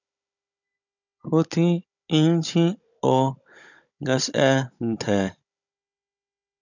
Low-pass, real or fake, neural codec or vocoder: 7.2 kHz; fake; codec, 16 kHz, 16 kbps, FunCodec, trained on Chinese and English, 50 frames a second